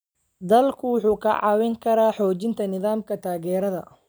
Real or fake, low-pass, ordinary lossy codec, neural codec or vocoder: real; none; none; none